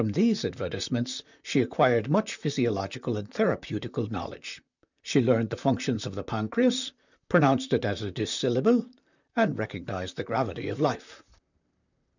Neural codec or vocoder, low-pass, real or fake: vocoder, 44.1 kHz, 128 mel bands, Pupu-Vocoder; 7.2 kHz; fake